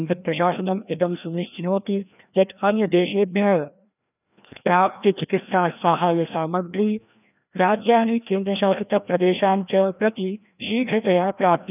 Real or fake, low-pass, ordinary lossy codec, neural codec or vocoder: fake; 3.6 kHz; none; codec, 16 kHz, 1 kbps, FreqCodec, larger model